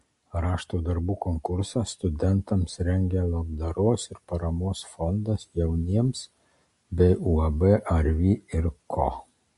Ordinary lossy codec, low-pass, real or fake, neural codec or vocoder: MP3, 48 kbps; 14.4 kHz; real; none